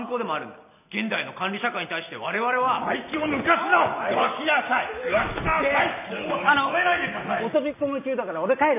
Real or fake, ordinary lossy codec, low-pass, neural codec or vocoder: real; none; 3.6 kHz; none